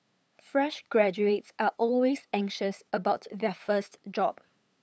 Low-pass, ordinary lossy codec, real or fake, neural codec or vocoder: none; none; fake; codec, 16 kHz, 8 kbps, FunCodec, trained on LibriTTS, 25 frames a second